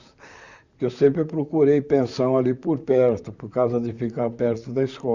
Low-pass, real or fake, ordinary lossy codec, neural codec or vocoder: 7.2 kHz; fake; Opus, 64 kbps; vocoder, 44.1 kHz, 128 mel bands, Pupu-Vocoder